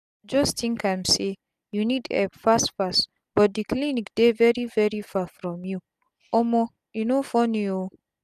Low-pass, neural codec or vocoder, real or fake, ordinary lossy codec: 14.4 kHz; none; real; none